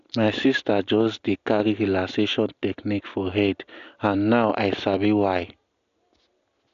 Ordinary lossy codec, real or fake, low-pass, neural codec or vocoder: AAC, 96 kbps; real; 7.2 kHz; none